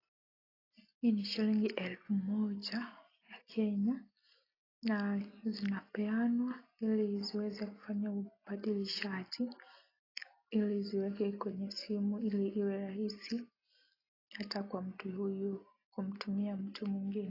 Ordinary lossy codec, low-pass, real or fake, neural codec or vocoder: AAC, 24 kbps; 5.4 kHz; real; none